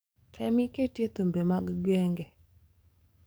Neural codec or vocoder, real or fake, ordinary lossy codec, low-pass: codec, 44.1 kHz, 7.8 kbps, DAC; fake; none; none